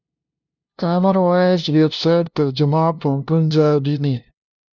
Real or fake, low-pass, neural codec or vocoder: fake; 7.2 kHz; codec, 16 kHz, 0.5 kbps, FunCodec, trained on LibriTTS, 25 frames a second